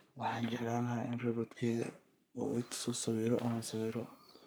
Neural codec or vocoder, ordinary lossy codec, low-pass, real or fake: codec, 44.1 kHz, 3.4 kbps, Pupu-Codec; none; none; fake